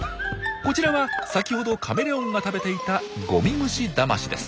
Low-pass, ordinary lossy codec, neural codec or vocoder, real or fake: none; none; none; real